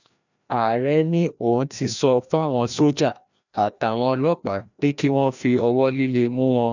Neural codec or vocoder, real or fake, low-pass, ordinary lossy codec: codec, 16 kHz, 1 kbps, FreqCodec, larger model; fake; 7.2 kHz; none